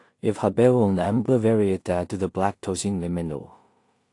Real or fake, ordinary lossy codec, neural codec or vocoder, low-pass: fake; AAC, 48 kbps; codec, 16 kHz in and 24 kHz out, 0.4 kbps, LongCat-Audio-Codec, two codebook decoder; 10.8 kHz